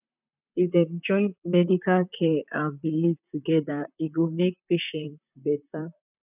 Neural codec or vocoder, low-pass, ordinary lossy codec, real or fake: vocoder, 44.1 kHz, 128 mel bands, Pupu-Vocoder; 3.6 kHz; none; fake